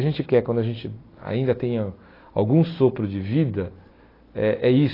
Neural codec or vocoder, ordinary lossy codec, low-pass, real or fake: none; AAC, 24 kbps; 5.4 kHz; real